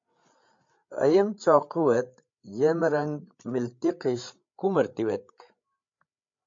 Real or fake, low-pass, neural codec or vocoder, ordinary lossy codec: fake; 7.2 kHz; codec, 16 kHz, 8 kbps, FreqCodec, larger model; MP3, 48 kbps